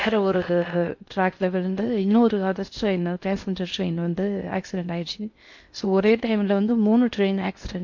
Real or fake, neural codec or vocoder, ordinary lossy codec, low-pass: fake; codec, 16 kHz in and 24 kHz out, 0.6 kbps, FocalCodec, streaming, 4096 codes; MP3, 48 kbps; 7.2 kHz